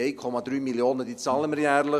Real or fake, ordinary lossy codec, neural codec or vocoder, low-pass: real; none; none; 14.4 kHz